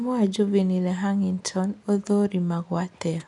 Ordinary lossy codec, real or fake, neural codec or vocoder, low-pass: none; real; none; 10.8 kHz